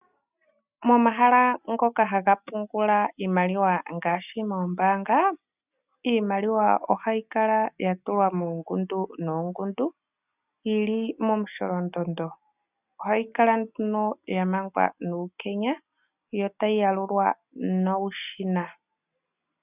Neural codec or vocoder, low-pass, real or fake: none; 3.6 kHz; real